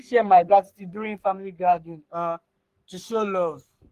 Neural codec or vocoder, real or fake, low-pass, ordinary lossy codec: codec, 44.1 kHz, 3.4 kbps, Pupu-Codec; fake; 14.4 kHz; Opus, 24 kbps